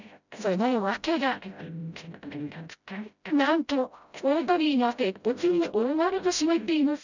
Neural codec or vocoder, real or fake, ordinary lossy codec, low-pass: codec, 16 kHz, 0.5 kbps, FreqCodec, smaller model; fake; none; 7.2 kHz